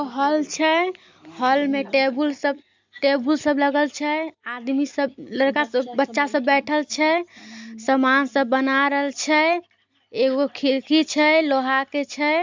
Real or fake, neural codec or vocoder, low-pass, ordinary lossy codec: real; none; 7.2 kHz; MP3, 64 kbps